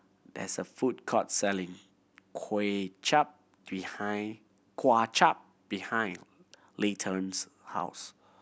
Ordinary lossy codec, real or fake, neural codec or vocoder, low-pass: none; real; none; none